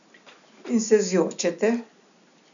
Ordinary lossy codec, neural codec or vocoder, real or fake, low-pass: none; none; real; 7.2 kHz